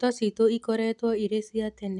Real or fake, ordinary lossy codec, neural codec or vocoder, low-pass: real; none; none; 10.8 kHz